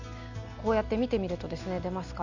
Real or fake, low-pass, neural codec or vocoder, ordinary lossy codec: real; 7.2 kHz; none; none